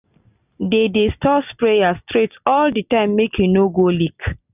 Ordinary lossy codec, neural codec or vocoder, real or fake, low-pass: none; none; real; 3.6 kHz